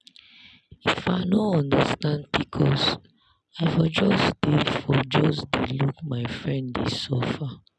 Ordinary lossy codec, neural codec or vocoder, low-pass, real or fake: none; none; none; real